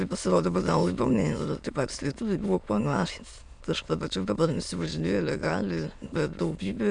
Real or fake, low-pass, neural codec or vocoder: fake; 9.9 kHz; autoencoder, 22.05 kHz, a latent of 192 numbers a frame, VITS, trained on many speakers